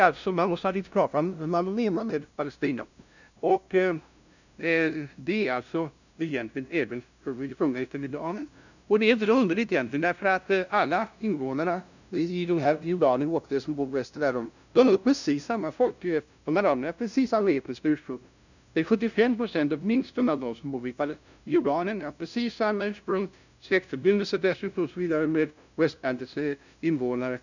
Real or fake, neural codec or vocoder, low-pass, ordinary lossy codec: fake; codec, 16 kHz, 0.5 kbps, FunCodec, trained on LibriTTS, 25 frames a second; 7.2 kHz; none